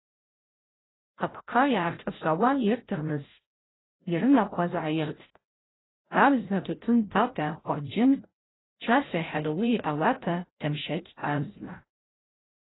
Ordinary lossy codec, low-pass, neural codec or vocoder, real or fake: AAC, 16 kbps; 7.2 kHz; codec, 16 kHz, 0.5 kbps, FreqCodec, larger model; fake